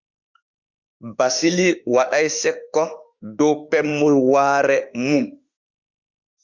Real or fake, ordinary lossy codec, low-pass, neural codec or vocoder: fake; Opus, 64 kbps; 7.2 kHz; autoencoder, 48 kHz, 32 numbers a frame, DAC-VAE, trained on Japanese speech